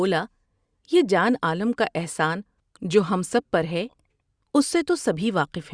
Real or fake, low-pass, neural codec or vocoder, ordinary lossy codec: real; 9.9 kHz; none; Opus, 64 kbps